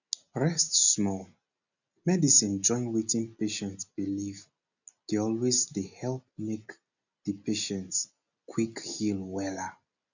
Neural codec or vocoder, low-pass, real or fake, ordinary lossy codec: none; 7.2 kHz; real; none